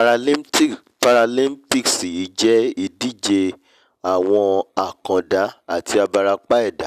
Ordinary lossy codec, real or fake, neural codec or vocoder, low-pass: none; real; none; 14.4 kHz